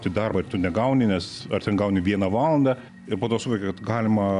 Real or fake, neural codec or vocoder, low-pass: real; none; 10.8 kHz